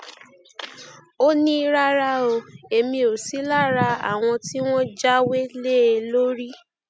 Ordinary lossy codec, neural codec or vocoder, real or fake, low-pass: none; none; real; none